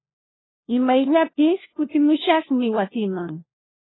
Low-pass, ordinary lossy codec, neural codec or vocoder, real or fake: 7.2 kHz; AAC, 16 kbps; codec, 16 kHz, 1 kbps, FunCodec, trained on LibriTTS, 50 frames a second; fake